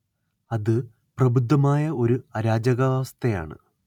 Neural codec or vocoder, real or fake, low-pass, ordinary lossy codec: none; real; 19.8 kHz; none